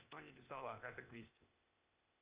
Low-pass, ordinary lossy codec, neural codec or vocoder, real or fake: 3.6 kHz; Opus, 64 kbps; codec, 16 kHz, 0.8 kbps, ZipCodec; fake